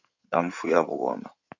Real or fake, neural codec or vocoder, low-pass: fake; codec, 16 kHz in and 24 kHz out, 2.2 kbps, FireRedTTS-2 codec; 7.2 kHz